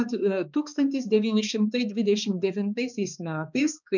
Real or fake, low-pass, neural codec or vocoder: fake; 7.2 kHz; codec, 16 kHz, 2 kbps, X-Codec, HuBERT features, trained on balanced general audio